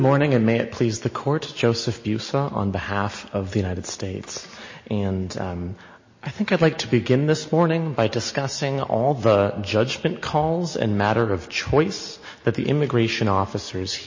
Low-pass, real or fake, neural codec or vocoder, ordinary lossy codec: 7.2 kHz; real; none; MP3, 32 kbps